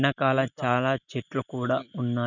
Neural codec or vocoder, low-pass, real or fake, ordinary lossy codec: none; 7.2 kHz; real; none